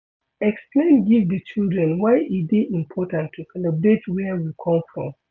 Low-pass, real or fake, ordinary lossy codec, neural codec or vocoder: none; real; none; none